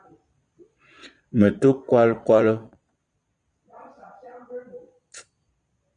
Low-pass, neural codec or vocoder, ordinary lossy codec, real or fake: 9.9 kHz; vocoder, 22.05 kHz, 80 mel bands, Vocos; Opus, 64 kbps; fake